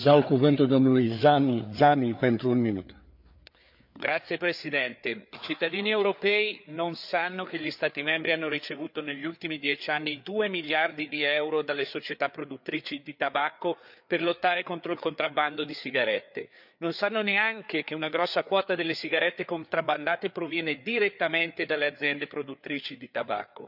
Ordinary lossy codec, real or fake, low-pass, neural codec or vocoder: none; fake; 5.4 kHz; codec, 16 kHz, 4 kbps, FreqCodec, larger model